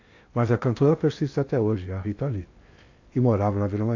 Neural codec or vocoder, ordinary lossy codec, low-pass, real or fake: codec, 16 kHz in and 24 kHz out, 0.8 kbps, FocalCodec, streaming, 65536 codes; AAC, 48 kbps; 7.2 kHz; fake